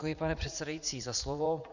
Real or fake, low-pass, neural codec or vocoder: fake; 7.2 kHz; vocoder, 44.1 kHz, 80 mel bands, Vocos